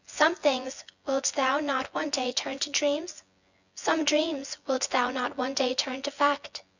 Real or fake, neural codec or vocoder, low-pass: fake; vocoder, 24 kHz, 100 mel bands, Vocos; 7.2 kHz